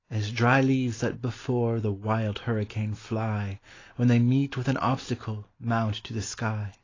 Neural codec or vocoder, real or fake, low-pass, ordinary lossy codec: none; real; 7.2 kHz; AAC, 32 kbps